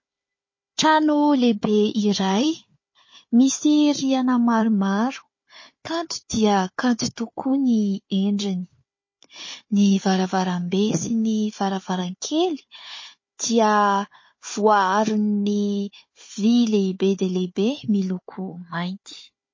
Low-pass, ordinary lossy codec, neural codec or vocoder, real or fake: 7.2 kHz; MP3, 32 kbps; codec, 16 kHz, 4 kbps, FunCodec, trained on Chinese and English, 50 frames a second; fake